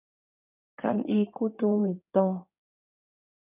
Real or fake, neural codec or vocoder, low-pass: fake; codec, 16 kHz in and 24 kHz out, 2.2 kbps, FireRedTTS-2 codec; 3.6 kHz